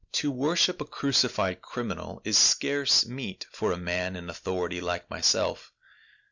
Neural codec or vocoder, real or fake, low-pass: none; real; 7.2 kHz